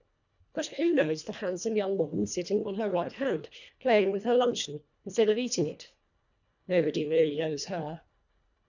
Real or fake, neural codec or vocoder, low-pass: fake; codec, 24 kHz, 1.5 kbps, HILCodec; 7.2 kHz